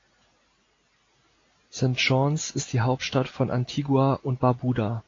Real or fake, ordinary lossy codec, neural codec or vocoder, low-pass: real; AAC, 32 kbps; none; 7.2 kHz